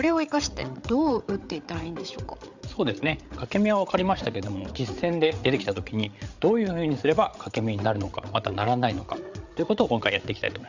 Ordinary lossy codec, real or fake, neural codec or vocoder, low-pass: Opus, 64 kbps; fake; codec, 16 kHz, 8 kbps, FreqCodec, larger model; 7.2 kHz